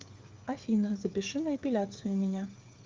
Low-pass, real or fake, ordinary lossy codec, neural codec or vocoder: 7.2 kHz; fake; Opus, 32 kbps; codec, 16 kHz, 8 kbps, FreqCodec, smaller model